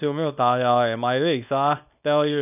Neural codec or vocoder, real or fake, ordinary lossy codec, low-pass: codec, 24 kHz, 0.9 kbps, WavTokenizer, small release; fake; none; 3.6 kHz